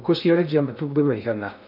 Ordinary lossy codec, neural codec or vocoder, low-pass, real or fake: none; codec, 16 kHz in and 24 kHz out, 0.6 kbps, FocalCodec, streaming, 2048 codes; 5.4 kHz; fake